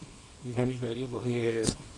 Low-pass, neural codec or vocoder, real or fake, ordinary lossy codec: 10.8 kHz; codec, 24 kHz, 0.9 kbps, WavTokenizer, small release; fake; AAC, 32 kbps